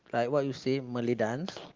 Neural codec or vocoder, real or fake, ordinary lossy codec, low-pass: none; real; Opus, 32 kbps; 7.2 kHz